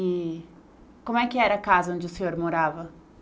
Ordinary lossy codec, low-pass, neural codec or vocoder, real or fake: none; none; none; real